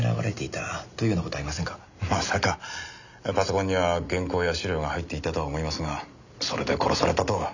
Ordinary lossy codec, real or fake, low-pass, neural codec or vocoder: none; real; 7.2 kHz; none